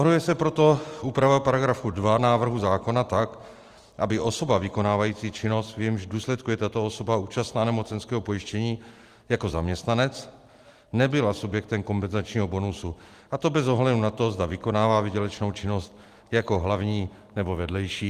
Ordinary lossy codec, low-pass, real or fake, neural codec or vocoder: Opus, 24 kbps; 14.4 kHz; real; none